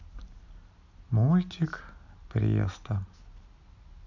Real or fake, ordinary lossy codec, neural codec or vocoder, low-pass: real; none; none; 7.2 kHz